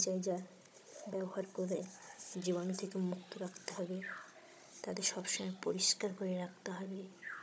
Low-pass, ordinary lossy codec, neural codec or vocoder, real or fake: none; none; codec, 16 kHz, 16 kbps, FunCodec, trained on Chinese and English, 50 frames a second; fake